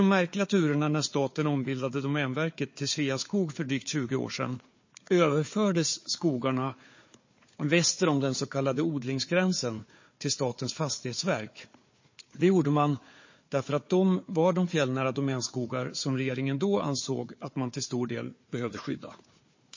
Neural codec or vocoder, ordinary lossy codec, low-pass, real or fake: codec, 16 kHz, 4 kbps, FunCodec, trained on Chinese and English, 50 frames a second; MP3, 32 kbps; 7.2 kHz; fake